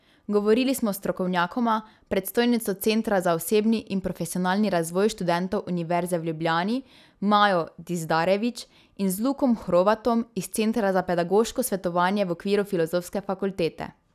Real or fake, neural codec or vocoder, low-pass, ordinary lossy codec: real; none; 14.4 kHz; none